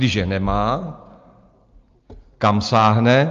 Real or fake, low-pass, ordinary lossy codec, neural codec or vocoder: real; 7.2 kHz; Opus, 32 kbps; none